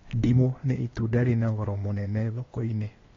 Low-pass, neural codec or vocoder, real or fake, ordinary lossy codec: 7.2 kHz; codec, 16 kHz, 0.8 kbps, ZipCodec; fake; AAC, 32 kbps